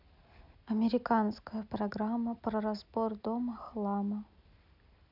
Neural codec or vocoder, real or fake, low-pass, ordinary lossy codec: none; real; 5.4 kHz; none